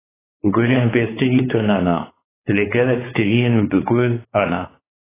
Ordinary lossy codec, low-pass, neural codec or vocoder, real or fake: AAC, 16 kbps; 3.6 kHz; codec, 16 kHz, 4 kbps, X-Codec, WavLM features, trained on Multilingual LibriSpeech; fake